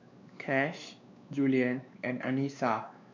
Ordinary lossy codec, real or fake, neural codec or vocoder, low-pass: MP3, 64 kbps; fake; codec, 16 kHz, 2 kbps, X-Codec, WavLM features, trained on Multilingual LibriSpeech; 7.2 kHz